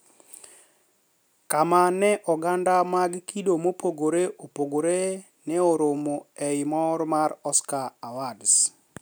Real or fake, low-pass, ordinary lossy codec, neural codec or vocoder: real; none; none; none